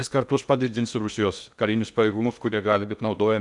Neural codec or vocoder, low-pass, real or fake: codec, 16 kHz in and 24 kHz out, 0.6 kbps, FocalCodec, streaming, 2048 codes; 10.8 kHz; fake